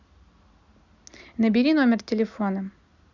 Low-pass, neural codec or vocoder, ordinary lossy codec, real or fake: 7.2 kHz; none; Opus, 64 kbps; real